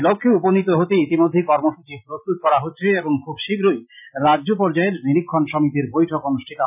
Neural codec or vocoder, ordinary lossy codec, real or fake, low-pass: none; none; real; 3.6 kHz